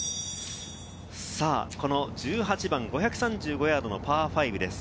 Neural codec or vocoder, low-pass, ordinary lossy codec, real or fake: none; none; none; real